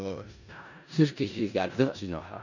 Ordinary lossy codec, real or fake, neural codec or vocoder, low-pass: none; fake; codec, 16 kHz in and 24 kHz out, 0.4 kbps, LongCat-Audio-Codec, four codebook decoder; 7.2 kHz